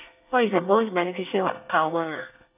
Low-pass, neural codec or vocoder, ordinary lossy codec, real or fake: 3.6 kHz; codec, 24 kHz, 1 kbps, SNAC; none; fake